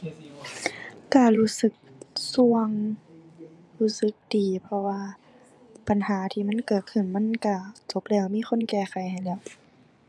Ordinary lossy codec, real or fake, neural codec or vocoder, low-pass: none; fake; vocoder, 24 kHz, 100 mel bands, Vocos; none